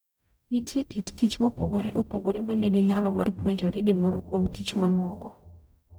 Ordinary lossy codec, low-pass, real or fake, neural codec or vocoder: none; none; fake; codec, 44.1 kHz, 0.9 kbps, DAC